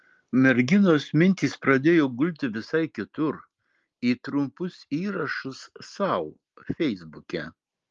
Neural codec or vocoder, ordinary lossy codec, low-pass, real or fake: none; Opus, 32 kbps; 7.2 kHz; real